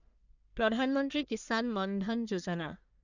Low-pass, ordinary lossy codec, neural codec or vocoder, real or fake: 7.2 kHz; none; codec, 44.1 kHz, 1.7 kbps, Pupu-Codec; fake